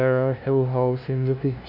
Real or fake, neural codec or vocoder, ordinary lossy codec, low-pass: fake; codec, 16 kHz, 0.5 kbps, FunCodec, trained on LibriTTS, 25 frames a second; none; 5.4 kHz